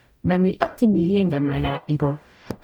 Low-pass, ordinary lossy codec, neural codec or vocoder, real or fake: 19.8 kHz; none; codec, 44.1 kHz, 0.9 kbps, DAC; fake